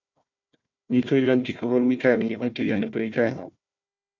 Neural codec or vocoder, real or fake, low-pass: codec, 16 kHz, 1 kbps, FunCodec, trained on Chinese and English, 50 frames a second; fake; 7.2 kHz